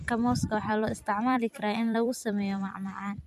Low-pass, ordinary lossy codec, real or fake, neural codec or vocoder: 14.4 kHz; none; fake; vocoder, 44.1 kHz, 128 mel bands every 256 samples, BigVGAN v2